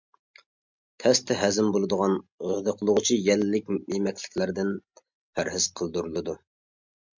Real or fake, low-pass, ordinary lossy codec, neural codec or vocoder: real; 7.2 kHz; MP3, 64 kbps; none